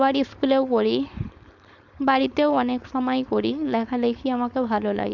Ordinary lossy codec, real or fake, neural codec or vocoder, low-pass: none; fake; codec, 16 kHz, 4.8 kbps, FACodec; 7.2 kHz